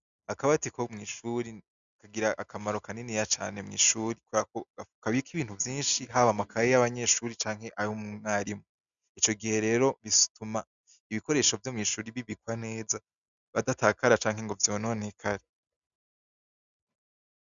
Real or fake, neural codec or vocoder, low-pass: real; none; 7.2 kHz